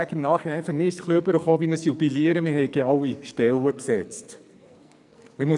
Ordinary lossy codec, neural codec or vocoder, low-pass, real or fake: none; codec, 44.1 kHz, 2.6 kbps, SNAC; 10.8 kHz; fake